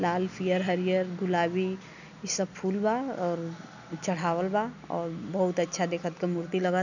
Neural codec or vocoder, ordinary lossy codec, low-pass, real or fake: none; none; 7.2 kHz; real